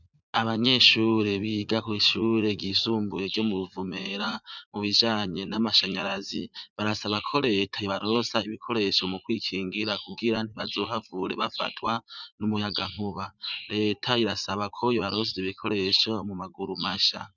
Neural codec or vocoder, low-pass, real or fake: vocoder, 44.1 kHz, 80 mel bands, Vocos; 7.2 kHz; fake